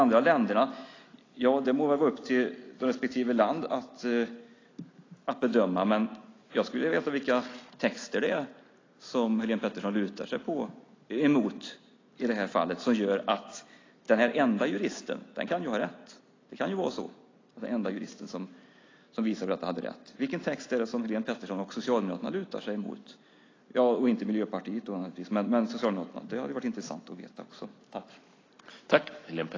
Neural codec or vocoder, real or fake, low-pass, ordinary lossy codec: none; real; 7.2 kHz; AAC, 32 kbps